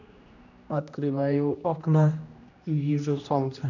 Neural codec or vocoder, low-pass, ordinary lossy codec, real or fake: codec, 16 kHz, 1 kbps, X-Codec, HuBERT features, trained on general audio; 7.2 kHz; none; fake